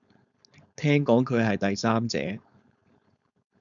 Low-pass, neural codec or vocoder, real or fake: 7.2 kHz; codec, 16 kHz, 4.8 kbps, FACodec; fake